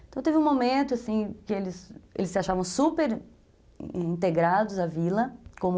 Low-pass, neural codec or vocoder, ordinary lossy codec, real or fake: none; none; none; real